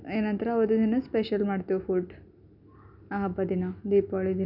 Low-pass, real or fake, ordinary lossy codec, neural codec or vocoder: 5.4 kHz; real; none; none